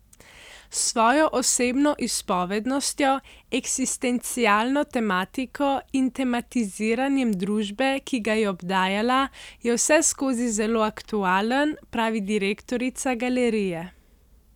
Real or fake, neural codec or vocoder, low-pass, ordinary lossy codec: real; none; 19.8 kHz; none